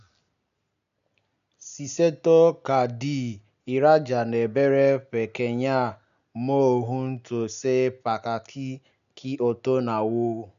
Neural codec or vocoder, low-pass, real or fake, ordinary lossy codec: none; 7.2 kHz; real; none